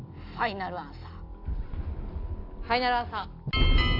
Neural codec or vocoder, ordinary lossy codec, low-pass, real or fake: none; AAC, 32 kbps; 5.4 kHz; real